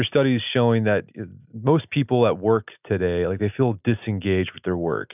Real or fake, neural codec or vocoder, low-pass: real; none; 3.6 kHz